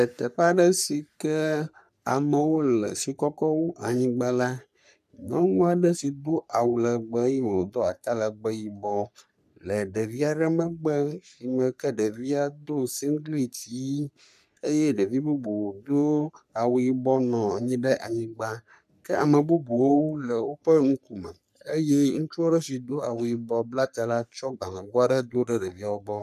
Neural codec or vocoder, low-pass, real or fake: codec, 44.1 kHz, 3.4 kbps, Pupu-Codec; 14.4 kHz; fake